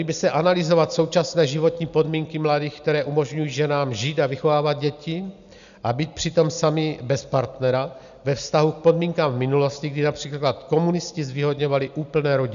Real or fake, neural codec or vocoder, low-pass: real; none; 7.2 kHz